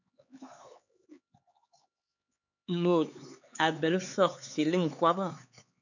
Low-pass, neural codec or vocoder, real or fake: 7.2 kHz; codec, 16 kHz, 4 kbps, X-Codec, HuBERT features, trained on LibriSpeech; fake